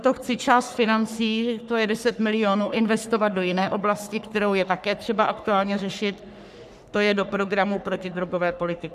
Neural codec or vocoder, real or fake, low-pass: codec, 44.1 kHz, 3.4 kbps, Pupu-Codec; fake; 14.4 kHz